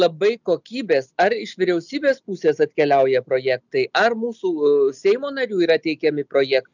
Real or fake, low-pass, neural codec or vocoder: real; 7.2 kHz; none